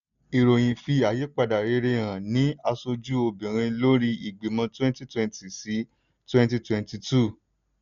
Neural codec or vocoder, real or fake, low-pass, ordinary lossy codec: none; real; 7.2 kHz; none